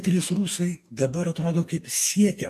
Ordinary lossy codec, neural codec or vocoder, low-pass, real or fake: AAC, 96 kbps; codec, 44.1 kHz, 2.6 kbps, DAC; 14.4 kHz; fake